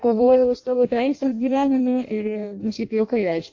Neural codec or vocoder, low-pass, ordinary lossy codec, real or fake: codec, 16 kHz in and 24 kHz out, 0.6 kbps, FireRedTTS-2 codec; 7.2 kHz; AAC, 48 kbps; fake